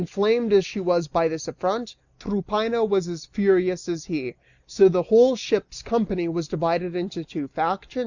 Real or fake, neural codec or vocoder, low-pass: real; none; 7.2 kHz